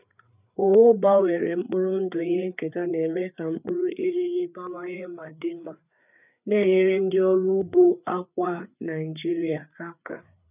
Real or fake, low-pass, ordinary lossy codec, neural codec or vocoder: fake; 3.6 kHz; none; codec, 16 kHz, 4 kbps, FreqCodec, larger model